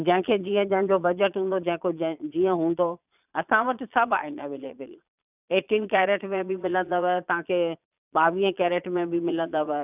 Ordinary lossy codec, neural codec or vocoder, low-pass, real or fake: none; vocoder, 22.05 kHz, 80 mel bands, Vocos; 3.6 kHz; fake